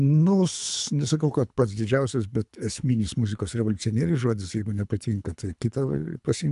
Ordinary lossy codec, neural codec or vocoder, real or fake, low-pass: MP3, 96 kbps; codec, 24 kHz, 3 kbps, HILCodec; fake; 10.8 kHz